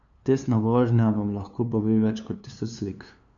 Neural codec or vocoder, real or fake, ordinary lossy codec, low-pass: codec, 16 kHz, 2 kbps, FunCodec, trained on LibriTTS, 25 frames a second; fake; none; 7.2 kHz